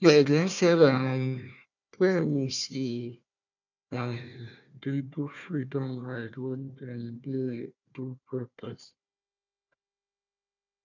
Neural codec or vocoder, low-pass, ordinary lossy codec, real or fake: codec, 16 kHz, 1 kbps, FunCodec, trained on Chinese and English, 50 frames a second; 7.2 kHz; none; fake